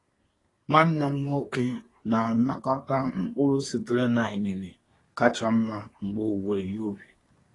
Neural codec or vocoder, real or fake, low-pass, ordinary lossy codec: codec, 24 kHz, 1 kbps, SNAC; fake; 10.8 kHz; AAC, 32 kbps